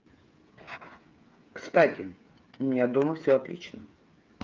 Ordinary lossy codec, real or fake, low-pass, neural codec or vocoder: Opus, 24 kbps; fake; 7.2 kHz; codec, 16 kHz, 8 kbps, FreqCodec, smaller model